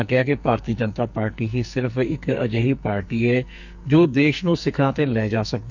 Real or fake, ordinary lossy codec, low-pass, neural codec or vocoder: fake; none; 7.2 kHz; codec, 44.1 kHz, 2.6 kbps, SNAC